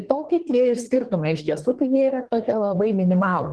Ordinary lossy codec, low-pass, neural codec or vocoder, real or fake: Opus, 16 kbps; 10.8 kHz; codec, 24 kHz, 1 kbps, SNAC; fake